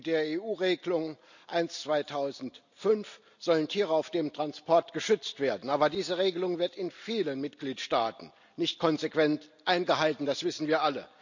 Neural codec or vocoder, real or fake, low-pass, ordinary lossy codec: none; real; 7.2 kHz; none